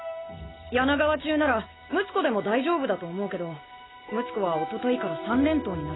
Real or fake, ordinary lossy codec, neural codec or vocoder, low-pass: real; AAC, 16 kbps; none; 7.2 kHz